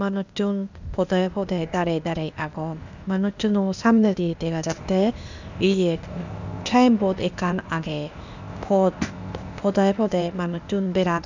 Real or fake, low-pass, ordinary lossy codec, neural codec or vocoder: fake; 7.2 kHz; none; codec, 16 kHz, 0.8 kbps, ZipCodec